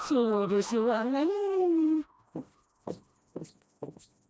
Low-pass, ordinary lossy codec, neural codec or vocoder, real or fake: none; none; codec, 16 kHz, 1 kbps, FreqCodec, smaller model; fake